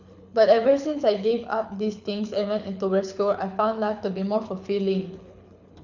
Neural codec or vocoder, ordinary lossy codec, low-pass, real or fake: codec, 24 kHz, 6 kbps, HILCodec; none; 7.2 kHz; fake